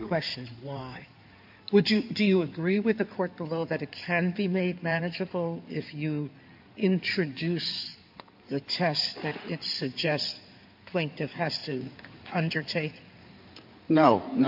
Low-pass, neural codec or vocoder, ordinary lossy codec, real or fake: 5.4 kHz; codec, 16 kHz in and 24 kHz out, 2.2 kbps, FireRedTTS-2 codec; MP3, 48 kbps; fake